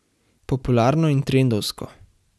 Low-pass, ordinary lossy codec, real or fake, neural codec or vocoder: none; none; real; none